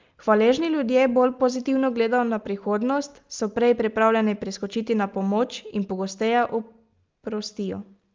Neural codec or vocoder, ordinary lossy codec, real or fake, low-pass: none; Opus, 32 kbps; real; 7.2 kHz